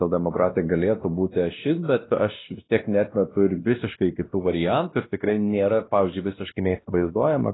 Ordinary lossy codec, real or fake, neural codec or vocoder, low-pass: AAC, 16 kbps; fake; codec, 16 kHz, 1 kbps, X-Codec, WavLM features, trained on Multilingual LibriSpeech; 7.2 kHz